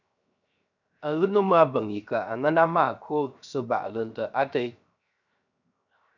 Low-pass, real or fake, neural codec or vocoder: 7.2 kHz; fake; codec, 16 kHz, 0.7 kbps, FocalCodec